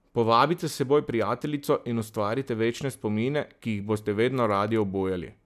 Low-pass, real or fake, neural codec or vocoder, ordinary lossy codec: 14.4 kHz; real; none; none